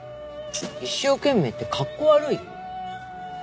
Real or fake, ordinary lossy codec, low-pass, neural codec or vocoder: real; none; none; none